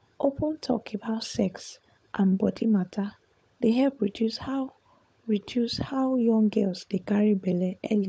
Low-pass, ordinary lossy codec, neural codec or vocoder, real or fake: none; none; codec, 16 kHz, 16 kbps, FunCodec, trained on LibriTTS, 50 frames a second; fake